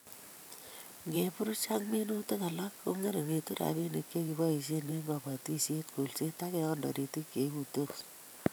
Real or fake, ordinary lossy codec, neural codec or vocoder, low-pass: fake; none; vocoder, 44.1 kHz, 128 mel bands every 512 samples, BigVGAN v2; none